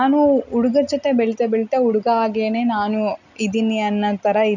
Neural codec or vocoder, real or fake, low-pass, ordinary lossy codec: none; real; 7.2 kHz; none